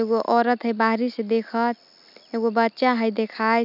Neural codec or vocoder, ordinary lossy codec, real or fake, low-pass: none; none; real; 5.4 kHz